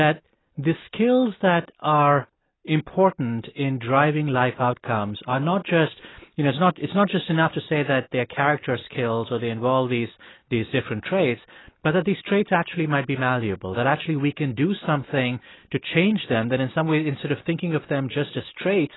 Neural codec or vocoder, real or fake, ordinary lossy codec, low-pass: none; real; AAC, 16 kbps; 7.2 kHz